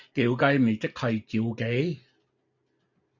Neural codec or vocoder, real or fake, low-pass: none; real; 7.2 kHz